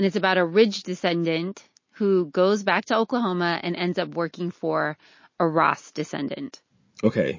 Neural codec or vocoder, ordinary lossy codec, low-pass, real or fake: none; MP3, 32 kbps; 7.2 kHz; real